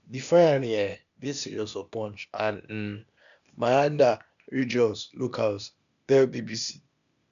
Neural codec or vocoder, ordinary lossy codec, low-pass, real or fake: codec, 16 kHz, 0.8 kbps, ZipCodec; none; 7.2 kHz; fake